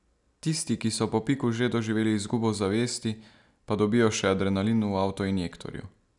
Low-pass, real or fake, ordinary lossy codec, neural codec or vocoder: 10.8 kHz; real; none; none